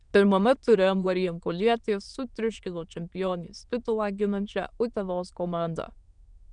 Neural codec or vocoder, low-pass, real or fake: autoencoder, 22.05 kHz, a latent of 192 numbers a frame, VITS, trained on many speakers; 9.9 kHz; fake